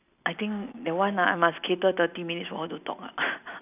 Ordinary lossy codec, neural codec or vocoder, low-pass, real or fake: none; none; 3.6 kHz; real